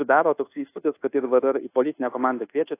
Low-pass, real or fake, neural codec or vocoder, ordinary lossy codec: 3.6 kHz; fake; codec, 24 kHz, 1.2 kbps, DualCodec; AAC, 24 kbps